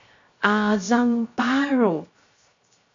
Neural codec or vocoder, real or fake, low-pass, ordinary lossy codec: codec, 16 kHz, 0.3 kbps, FocalCodec; fake; 7.2 kHz; MP3, 48 kbps